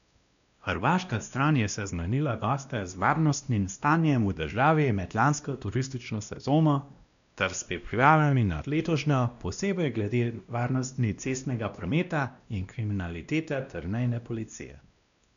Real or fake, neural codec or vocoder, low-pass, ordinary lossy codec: fake; codec, 16 kHz, 1 kbps, X-Codec, WavLM features, trained on Multilingual LibriSpeech; 7.2 kHz; none